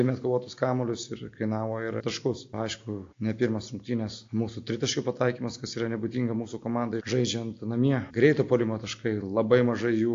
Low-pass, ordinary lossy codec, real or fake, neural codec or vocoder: 7.2 kHz; AAC, 48 kbps; real; none